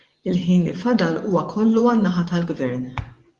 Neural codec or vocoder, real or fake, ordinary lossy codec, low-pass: vocoder, 24 kHz, 100 mel bands, Vocos; fake; Opus, 24 kbps; 10.8 kHz